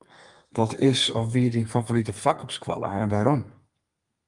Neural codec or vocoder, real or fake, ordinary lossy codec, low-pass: codec, 32 kHz, 1.9 kbps, SNAC; fake; Opus, 32 kbps; 10.8 kHz